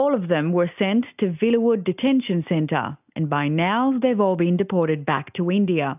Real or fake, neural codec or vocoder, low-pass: real; none; 3.6 kHz